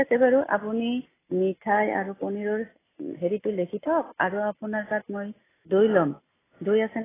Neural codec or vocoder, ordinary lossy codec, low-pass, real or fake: none; AAC, 16 kbps; 3.6 kHz; real